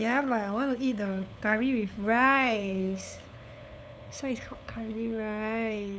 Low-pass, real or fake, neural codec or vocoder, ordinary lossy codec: none; fake; codec, 16 kHz, 8 kbps, FunCodec, trained on LibriTTS, 25 frames a second; none